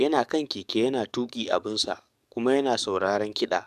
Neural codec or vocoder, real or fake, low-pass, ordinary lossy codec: codec, 44.1 kHz, 7.8 kbps, DAC; fake; 14.4 kHz; none